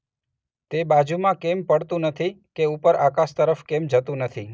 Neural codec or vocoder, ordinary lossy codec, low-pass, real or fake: none; none; none; real